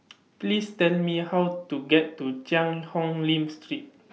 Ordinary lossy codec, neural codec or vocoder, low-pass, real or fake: none; none; none; real